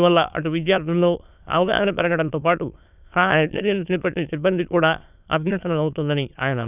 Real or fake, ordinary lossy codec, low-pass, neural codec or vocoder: fake; none; 3.6 kHz; autoencoder, 22.05 kHz, a latent of 192 numbers a frame, VITS, trained on many speakers